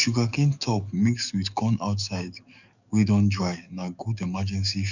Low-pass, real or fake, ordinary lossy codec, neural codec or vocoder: 7.2 kHz; fake; none; codec, 44.1 kHz, 7.8 kbps, DAC